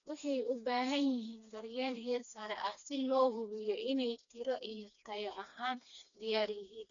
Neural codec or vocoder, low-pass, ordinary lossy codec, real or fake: codec, 16 kHz, 2 kbps, FreqCodec, smaller model; 7.2 kHz; none; fake